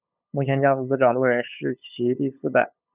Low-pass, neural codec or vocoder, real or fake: 3.6 kHz; codec, 16 kHz, 8 kbps, FunCodec, trained on LibriTTS, 25 frames a second; fake